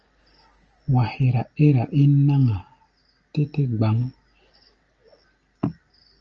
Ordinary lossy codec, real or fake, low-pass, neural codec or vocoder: Opus, 24 kbps; real; 7.2 kHz; none